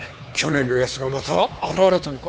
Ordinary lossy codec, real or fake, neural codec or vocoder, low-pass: none; fake; codec, 16 kHz, 4 kbps, X-Codec, HuBERT features, trained on LibriSpeech; none